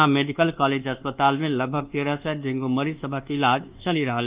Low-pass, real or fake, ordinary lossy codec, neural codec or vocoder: 3.6 kHz; fake; Opus, 32 kbps; codec, 24 kHz, 1.2 kbps, DualCodec